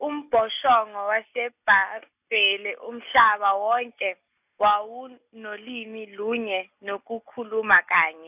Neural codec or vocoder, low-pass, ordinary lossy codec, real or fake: none; 3.6 kHz; none; real